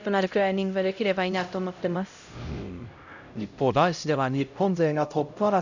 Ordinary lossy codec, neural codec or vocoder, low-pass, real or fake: none; codec, 16 kHz, 0.5 kbps, X-Codec, HuBERT features, trained on LibriSpeech; 7.2 kHz; fake